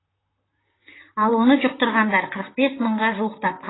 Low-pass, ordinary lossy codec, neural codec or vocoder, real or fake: 7.2 kHz; AAC, 16 kbps; vocoder, 44.1 kHz, 128 mel bands, Pupu-Vocoder; fake